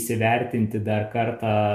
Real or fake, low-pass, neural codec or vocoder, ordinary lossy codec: real; 14.4 kHz; none; MP3, 64 kbps